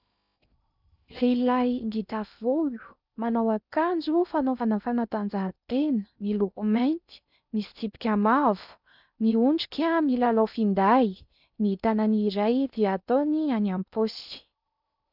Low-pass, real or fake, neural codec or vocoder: 5.4 kHz; fake; codec, 16 kHz in and 24 kHz out, 0.6 kbps, FocalCodec, streaming, 2048 codes